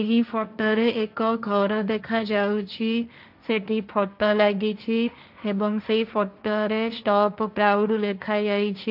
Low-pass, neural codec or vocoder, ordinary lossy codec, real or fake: 5.4 kHz; codec, 16 kHz, 1.1 kbps, Voila-Tokenizer; none; fake